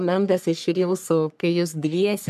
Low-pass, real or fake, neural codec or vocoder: 14.4 kHz; fake; codec, 44.1 kHz, 3.4 kbps, Pupu-Codec